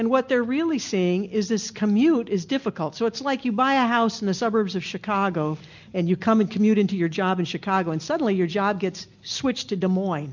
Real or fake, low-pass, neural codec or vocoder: real; 7.2 kHz; none